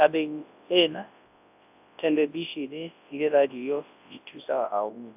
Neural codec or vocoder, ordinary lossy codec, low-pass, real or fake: codec, 24 kHz, 0.9 kbps, WavTokenizer, large speech release; AAC, 32 kbps; 3.6 kHz; fake